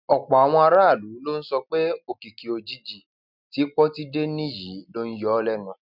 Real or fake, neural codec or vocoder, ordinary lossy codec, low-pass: real; none; none; 5.4 kHz